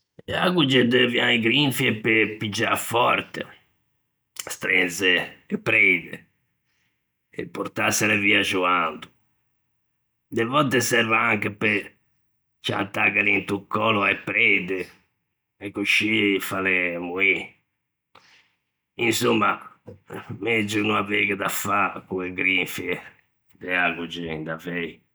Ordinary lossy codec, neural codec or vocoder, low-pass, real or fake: none; none; none; real